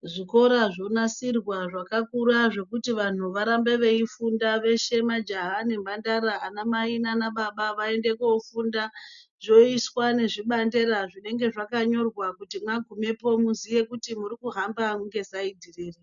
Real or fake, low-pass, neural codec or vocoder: real; 7.2 kHz; none